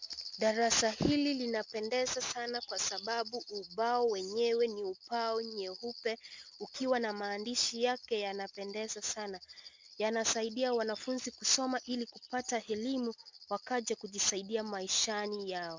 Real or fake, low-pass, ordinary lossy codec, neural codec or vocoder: real; 7.2 kHz; MP3, 64 kbps; none